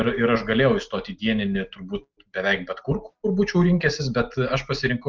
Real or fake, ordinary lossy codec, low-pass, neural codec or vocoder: real; Opus, 32 kbps; 7.2 kHz; none